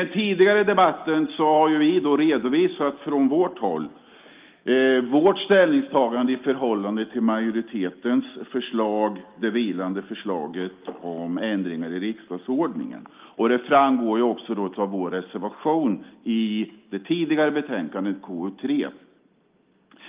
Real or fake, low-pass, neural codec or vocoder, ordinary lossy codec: real; 3.6 kHz; none; Opus, 24 kbps